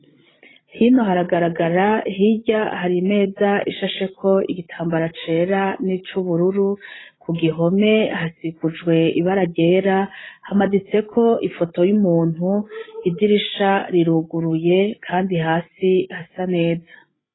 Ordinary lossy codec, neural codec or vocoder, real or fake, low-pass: AAC, 16 kbps; codec, 16 kHz, 16 kbps, FreqCodec, larger model; fake; 7.2 kHz